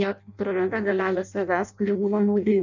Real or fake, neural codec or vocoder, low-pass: fake; codec, 16 kHz in and 24 kHz out, 0.6 kbps, FireRedTTS-2 codec; 7.2 kHz